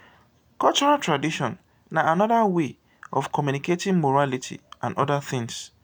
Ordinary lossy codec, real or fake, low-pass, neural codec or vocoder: none; real; none; none